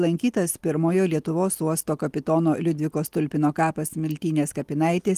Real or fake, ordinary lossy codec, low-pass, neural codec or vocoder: real; Opus, 24 kbps; 14.4 kHz; none